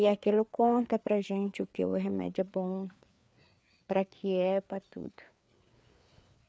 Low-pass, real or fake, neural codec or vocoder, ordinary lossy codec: none; fake; codec, 16 kHz, 4 kbps, FreqCodec, larger model; none